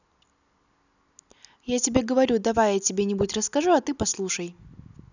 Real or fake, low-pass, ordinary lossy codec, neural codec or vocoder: real; 7.2 kHz; none; none